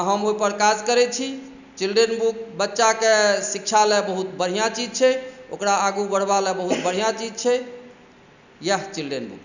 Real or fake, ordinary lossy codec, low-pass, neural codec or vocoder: real; none; 7.2 kHz; none